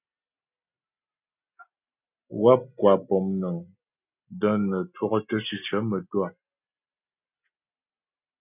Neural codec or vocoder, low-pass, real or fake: none; 3.6 kHz; real